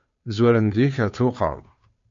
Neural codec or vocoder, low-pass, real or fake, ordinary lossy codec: codec, 16 kHz, 2 kbps, FunCodec, trained on Chinese and English, 25 frames a second; 7.2 kHz; fake; MP3, 48 kbps